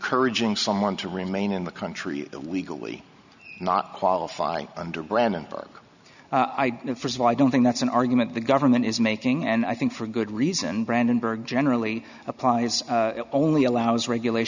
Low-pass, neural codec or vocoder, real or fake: 7.2 kHz; none; real